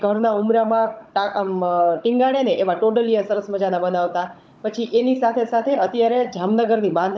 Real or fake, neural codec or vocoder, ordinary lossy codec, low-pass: fake; codec, 16 kHz, 16 kbps, FunCodec, trained on Chinese and English, 50 frames a second; none; none